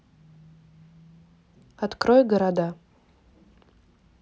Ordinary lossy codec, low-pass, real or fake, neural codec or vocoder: none; none; real; none